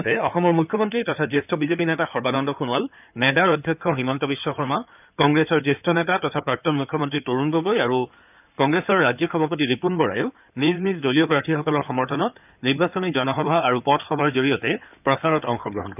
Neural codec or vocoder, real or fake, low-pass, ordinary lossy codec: codec, 16 kHz in and 24 kHz out, 2.2 kbps, FireRedTTS-2 codec; fake; 3.6 kHz; none